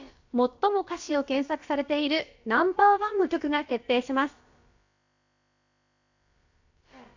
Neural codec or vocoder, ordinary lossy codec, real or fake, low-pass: codec, 16 kHz, about 1 kbps, DyCAST, with the encoder's durations; AAC, 48 kbps; fake; 7.2 kHz